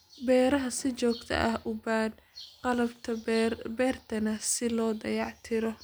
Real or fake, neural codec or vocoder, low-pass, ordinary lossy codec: real; none; none; none